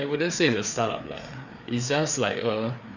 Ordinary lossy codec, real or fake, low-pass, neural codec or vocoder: none; fake; 7.2 kHz; codec, 16 kHz, 4 kbps, FreqCodec, larger model